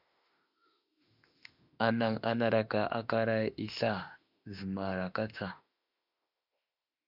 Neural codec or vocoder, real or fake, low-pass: autoencoder, 48 kHz, 32 numbers a frame, DAC-VAE, trained on Japanese speech; fake; 5.4 kHz